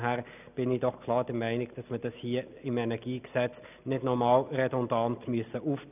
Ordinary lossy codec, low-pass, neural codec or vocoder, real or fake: none; 3.6 kHz; none; real